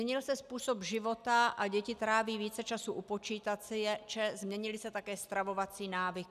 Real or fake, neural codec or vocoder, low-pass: real; none; 14.4 kHz